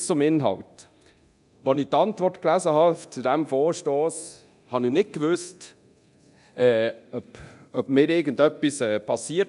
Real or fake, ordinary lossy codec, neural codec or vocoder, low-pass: fake; none; codec, 24 kHz, 0.9 kbps, DualCodec; 10.8 kHz